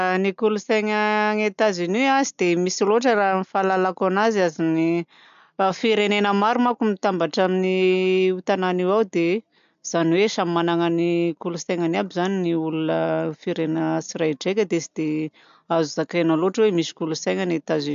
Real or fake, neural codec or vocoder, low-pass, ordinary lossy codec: real; none; 7.2 kHz; MP3, 64 kbps